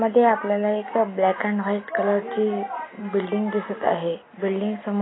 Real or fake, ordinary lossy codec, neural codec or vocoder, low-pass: real; AAC, 16 kbps; none; 7.2 kHz